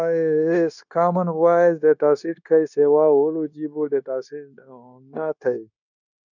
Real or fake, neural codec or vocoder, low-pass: fake; codec, 16 kHz, 0.9 kbps, LongCat-Audio-Codec; 7.2 kHz